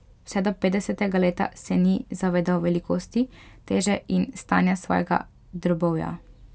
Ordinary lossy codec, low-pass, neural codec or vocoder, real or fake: none; none; none; real